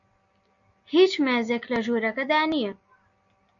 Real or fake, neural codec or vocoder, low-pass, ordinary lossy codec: real; none; 7.2 kHz; AAC, 64 kbps